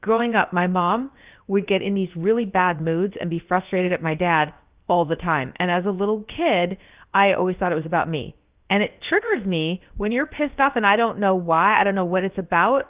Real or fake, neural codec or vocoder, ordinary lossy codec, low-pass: fake; codec, 16 kHz, about 1 kbps, DyCAST, with the encoder's durations; Opus, 32 kbps; 3.6 kHz